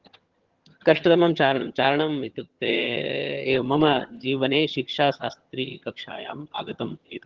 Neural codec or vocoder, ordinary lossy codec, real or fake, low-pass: vocoder, 22.05 kHz, 80 mel bands, HiFi-GAN; Opus, 16 kbps; fake; 7.2 kHz